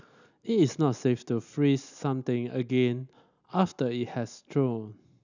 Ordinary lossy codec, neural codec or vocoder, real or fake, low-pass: none; none; real; 7.2 kHz